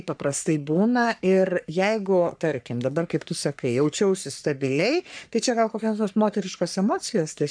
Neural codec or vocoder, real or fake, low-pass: codec, 44.1 kHz, 3.4 kbps, Pupu-Codec; fake; 9.9 kHz